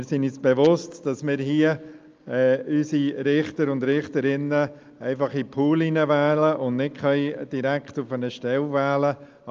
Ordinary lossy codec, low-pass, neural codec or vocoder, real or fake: Opus, 24 kbps; 7.2 kHz; none; real